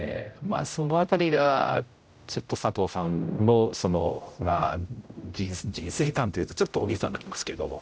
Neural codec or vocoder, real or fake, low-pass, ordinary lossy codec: codec, 16 kHz, 0.5 kbps, X-Codec, HuBERT features, trained on general audio; fake; none; none